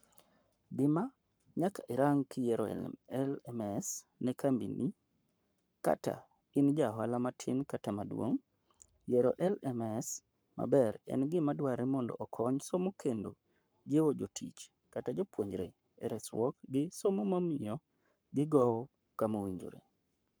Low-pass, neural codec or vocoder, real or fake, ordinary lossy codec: none; codec, 44.1 kHz, 7.8 kbps, Pupu-Codec; fake; none